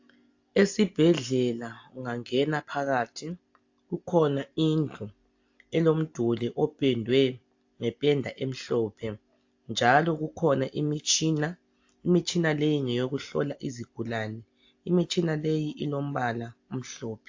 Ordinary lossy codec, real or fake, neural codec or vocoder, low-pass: AAC, 48 kbps; real; none; 7.2 kHz